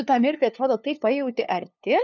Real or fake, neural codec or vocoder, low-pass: fake; codec, 16 kHz, 2 kbps, FunCodec, trained on LibriTTS, 25 frames a second; 7.2 kHz